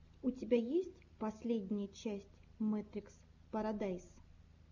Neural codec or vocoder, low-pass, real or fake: none; 7.2 kHz; real